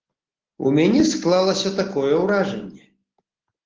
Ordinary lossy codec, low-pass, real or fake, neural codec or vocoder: Opus, 16 kbps; 7.2 kHz; real; none